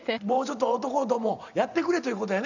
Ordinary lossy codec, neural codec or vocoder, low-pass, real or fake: none; vocoder, 44.1 kHz, 128 mel bands every 512 samples, BigVGAN v2; 7.2 kHz; fake